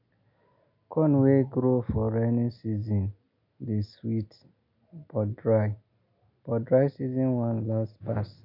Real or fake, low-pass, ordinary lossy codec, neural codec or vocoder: real; 5.4 kHz; none; none